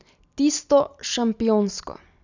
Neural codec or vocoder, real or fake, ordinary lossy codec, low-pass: none; real; none; 7.2 kHz